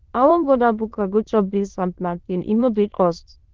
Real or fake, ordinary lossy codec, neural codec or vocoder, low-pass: fake; Opus, 16 kbps; autoencoder, 22.05 kHz, a latent of 192 numbers a frame, VITS, trained on many speakers; 7.2 kHz